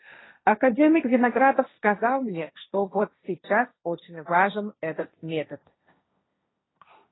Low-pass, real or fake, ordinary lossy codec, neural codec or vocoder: 7.2 kHz; fake; AAC, 16 kbps; codec, 16 kHz, 1.1 kbps, Voila-Tokenizer